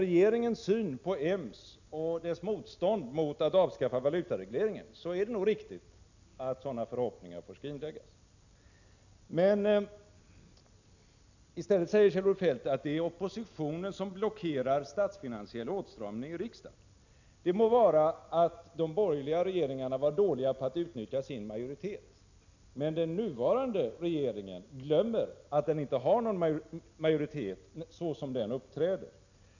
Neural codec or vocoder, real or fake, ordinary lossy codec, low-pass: none; real; none; 7.2 kHz